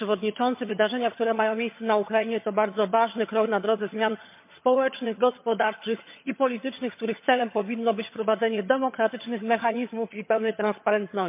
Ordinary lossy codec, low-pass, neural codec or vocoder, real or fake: MP3, 32 kbps; 3.6 kHz; vocoder, 22.05 kHz, 80 mel bands, HiFi-GAN; fake